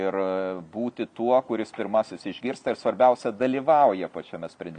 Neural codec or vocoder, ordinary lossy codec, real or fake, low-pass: none; MP3, 64 kbps; real; 9.9 kHz